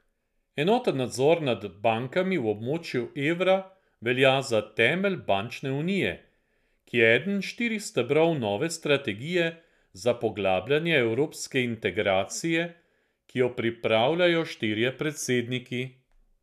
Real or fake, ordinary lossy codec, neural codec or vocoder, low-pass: real; none; none; 14.4 kHz